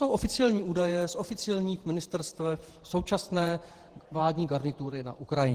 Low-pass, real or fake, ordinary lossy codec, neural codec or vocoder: 14.4 kHz; fake; Opus, 16 kbps; vocoder, 48 kHz, 128 mel bands, Vocos